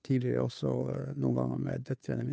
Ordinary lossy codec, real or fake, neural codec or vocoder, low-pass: none; fake; codec, 16 kHz, 2 kbps, FunCodec, trained on Chinese and English, 25 frames a second; none